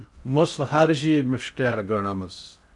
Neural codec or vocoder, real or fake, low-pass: codec, 16 kHz in and 24 kHz out, 0.6 kbps, FocalCodec, streaming, 4096 codes; fake; 10.8 kHz